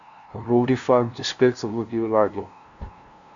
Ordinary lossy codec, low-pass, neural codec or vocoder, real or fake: Opus, 64 kbps; 7.2 kHz; codec, 16 kHz, 0.5 kbps, FunCodec, trained on LibriTTS, 25 frames a second; fake